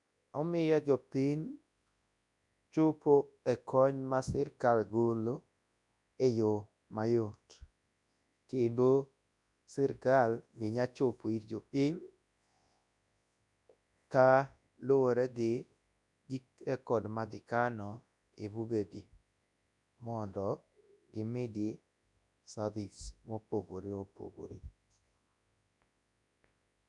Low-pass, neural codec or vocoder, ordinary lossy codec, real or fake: 10.8 kHz; codec, 24 kHz, 0.9 kbps, WavTokenizer, large speech release; none; fake